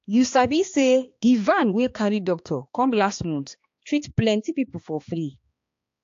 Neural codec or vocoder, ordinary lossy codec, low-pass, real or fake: codec, 16 kHz, 2 kbps, X-Codec, HuBERT features, trained on balanced general audio; AAC, 48 kbps; 7.2 kHz; fake